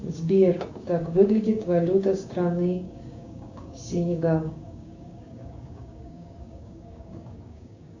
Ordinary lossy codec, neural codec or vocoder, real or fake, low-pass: AAC, 48 kbps; codec, 16 kHz, 6 kbps, DAC; fake; 7.2 kHz